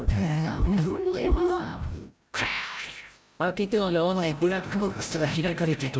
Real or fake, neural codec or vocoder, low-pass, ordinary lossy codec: fake; codec, 16 kHz, 0.5 kbps, FreqCodec, larger model; none; none